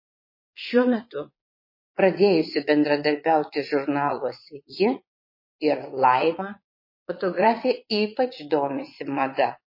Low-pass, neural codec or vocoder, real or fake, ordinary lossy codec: 5.4 kHz; vocoder, 22.05 kHz, 80 mel bands, Vocos; fake; MP3, 24 kbps